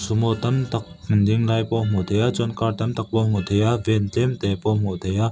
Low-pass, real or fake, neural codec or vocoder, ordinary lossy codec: none; real; none; none